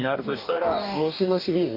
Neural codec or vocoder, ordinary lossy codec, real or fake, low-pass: codec, 44.1 kHz, 2.6 kbps, DAC; MP3, 48 kbps; fake; 5.4 kHz